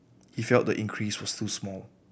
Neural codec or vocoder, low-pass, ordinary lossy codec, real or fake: none; none; none; real